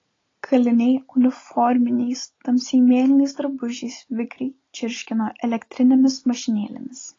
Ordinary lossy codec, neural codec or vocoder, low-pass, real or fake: AAC, 32 kbps; none; 7.2 kHz; real